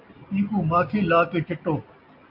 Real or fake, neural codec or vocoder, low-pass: real; none; 5.4 kHz